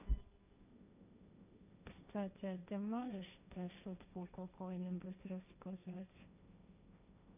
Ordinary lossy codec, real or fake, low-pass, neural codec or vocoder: none; fake; 3.6 kHz; codec, 16 kHz, 1.1 kbps, Voila-Tokenizer